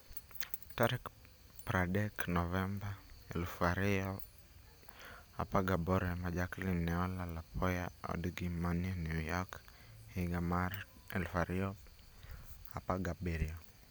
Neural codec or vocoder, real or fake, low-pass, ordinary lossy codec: none; real; none; none